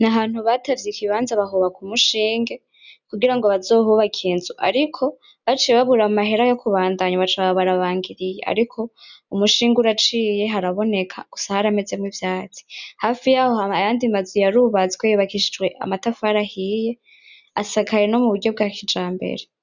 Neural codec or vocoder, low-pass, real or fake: none; 7.2 kHz; real